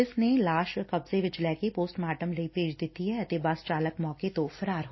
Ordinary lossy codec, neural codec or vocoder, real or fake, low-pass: MP3, 24 kbps; none; real; 7.2 kHz